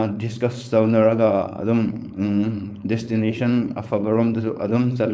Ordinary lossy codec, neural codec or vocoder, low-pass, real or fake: none; codec, 16 kHz, 4.8 kbps, FACodec; none; fake